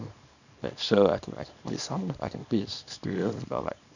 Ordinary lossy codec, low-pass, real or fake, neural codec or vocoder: none; 7.2 kHz; fake; codec, 24 kHz, 0.9 kbps, WavTokenizer, small release